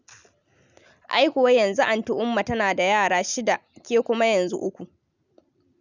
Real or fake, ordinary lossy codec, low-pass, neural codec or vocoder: real; none; 7.2 kHz; none